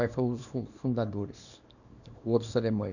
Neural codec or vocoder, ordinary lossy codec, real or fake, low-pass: codec, 24 kHz, 0.9 kbps, WavTokenizer, small release; none; fake; 7.2 kHz